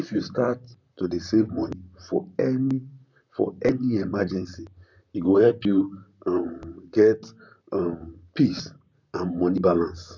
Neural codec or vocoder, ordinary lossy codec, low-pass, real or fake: vocoder, 44.1 kHz, 128 mel bands, Pupu-Vocoder; none; 7.2 kHz; fake